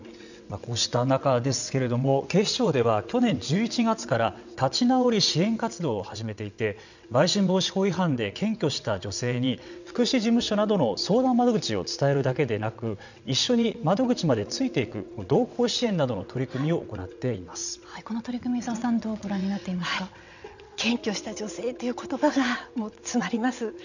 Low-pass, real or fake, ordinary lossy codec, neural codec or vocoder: 7.2 kHz; fake; none; vocoder, 22.05 kHz, 80 mel bands, WaveNeXt